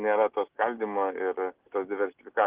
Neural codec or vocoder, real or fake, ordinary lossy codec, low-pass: none; real; Opus, 16 kbps; 3.6 kHz